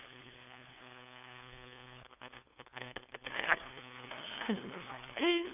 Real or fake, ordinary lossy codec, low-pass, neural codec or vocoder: fake; none; 3.6 kHz; codec, 16 kHz, 2 kbps, FunCodec, trained on LibriTTS, 25 frames a second